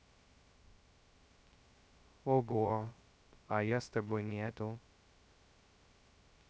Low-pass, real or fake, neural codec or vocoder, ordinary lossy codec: none; fake; codec, 16 kHz, 0.2 kbps, FocalCodec; none